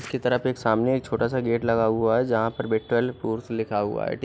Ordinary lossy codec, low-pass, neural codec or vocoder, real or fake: none; none; none; real